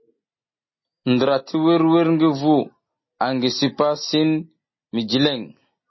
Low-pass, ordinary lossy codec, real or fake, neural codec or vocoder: 7.2 kHz; MP3, 24 kbps; real; none